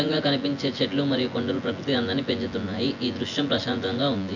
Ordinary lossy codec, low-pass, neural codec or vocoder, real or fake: AAC, 48 kbps; 7.2 kHz; vocoder, 24 kHz, 100 mel bands, Vocos; fake